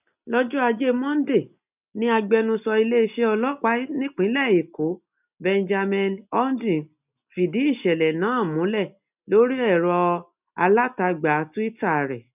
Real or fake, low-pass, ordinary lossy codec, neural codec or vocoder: real; 3.6 kHz; none; none